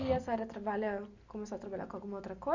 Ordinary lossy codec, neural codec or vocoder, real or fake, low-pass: Opus, 64 kbps; none; real; 7.2 kHz